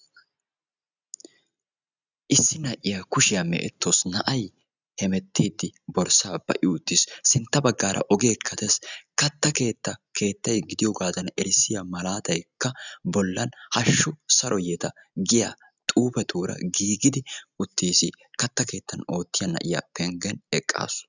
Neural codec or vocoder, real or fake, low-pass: none; real; 7.2 kHz